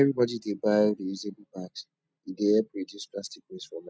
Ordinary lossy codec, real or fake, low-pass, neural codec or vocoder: none; real; none; none